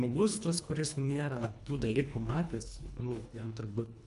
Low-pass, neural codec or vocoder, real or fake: 10.8 kHz; codec, 24 kHz, 1.5 kbps, HILCodec; fake